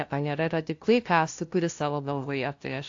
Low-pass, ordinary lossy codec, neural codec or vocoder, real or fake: 7.2 kHz; AAC, 48 kbps; codec, 16 kHz, 0.5 kbps, FunCodec, trained on LibriTTS, 25 frames a second; fake